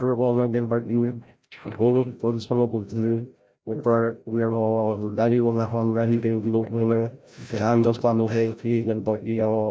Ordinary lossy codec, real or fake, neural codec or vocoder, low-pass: none; fake; codec, 16 kHz, 0.5 kbps, FreqCodec, larger model; none